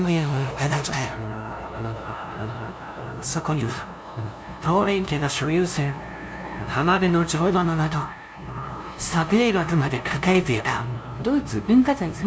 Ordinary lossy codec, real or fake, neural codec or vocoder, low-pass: none; fake; codec, 16 kHz, 0.5 kbps, FunCodec, trained on LibriTTS, 25 frames a second; none